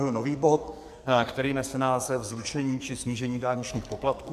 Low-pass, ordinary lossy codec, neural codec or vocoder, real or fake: 14.4 kHz; MP3, 96 kbps; codec, 44.1 kHz, 2.6 kbps, SNAC; fake